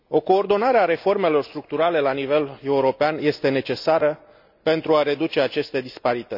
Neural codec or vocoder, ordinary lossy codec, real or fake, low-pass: none; none; real; 5.4 kHz